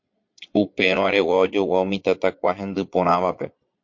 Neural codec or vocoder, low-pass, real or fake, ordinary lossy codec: vocoder, 22.05 kHz, 80 mel bands, Vocos; 7.2 kHz; fake; MP3, 48 kbps